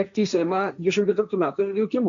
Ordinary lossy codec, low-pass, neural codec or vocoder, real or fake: MP3, 64 kbps; 7.2 kHz; codec, 16 kHz, 1.1 kbps, Voila-Tokenizer; fake